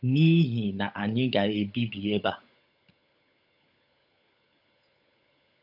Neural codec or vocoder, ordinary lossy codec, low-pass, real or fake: codec, 16 kHz, 16 kbps, FunCodec, trained on LibriTTS, 50 frames a second; none; 5.4 kHz; fake